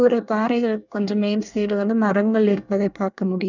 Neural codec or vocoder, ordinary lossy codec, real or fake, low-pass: codec, 24 kHz, 1 kbps, SNAC; none; fake; 7.2 kHz